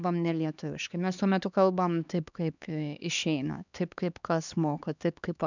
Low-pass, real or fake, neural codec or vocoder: 7.2 kHz; fake; codec, 16 kHz, 2 kbps, X-Codec, HuBERT features, trained on LibriSpeech